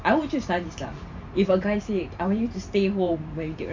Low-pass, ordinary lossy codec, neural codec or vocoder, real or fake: 7.2 kHz; MP3, 64 kbps; vocoder, 44.1 kHz, 128 mel bands every 512 samples, BigVGAN v2; fake